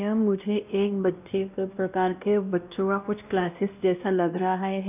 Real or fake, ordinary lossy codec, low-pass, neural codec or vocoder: fake; none; 3.6 kHz; codec, 16 kHz, 1 kbps, X-Codec, WavLM features, trained on Multilingual LibriSpeech